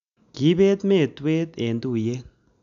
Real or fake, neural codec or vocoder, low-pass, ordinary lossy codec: real; none; 7.2 kHz; MP3, 96 kbps